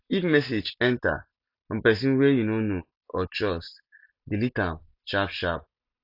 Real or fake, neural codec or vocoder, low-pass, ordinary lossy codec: real; none; 5.4 kHz; none